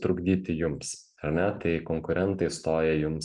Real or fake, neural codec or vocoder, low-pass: real; none; 10.8 kHz